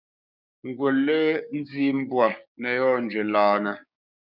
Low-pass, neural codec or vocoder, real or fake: 5.4 kHz; codec, 16 kHz, 6 kbps, DAC; fake